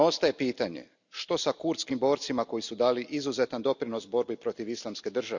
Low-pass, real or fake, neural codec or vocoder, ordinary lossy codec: 7.2 kHz; real; none; none